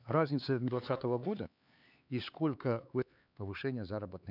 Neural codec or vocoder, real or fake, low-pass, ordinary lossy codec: codec, 16 kHz, 4 kbps, X-Codec, HuBERT features, trained on LibriSpeech; fake; 5.4 kHz; none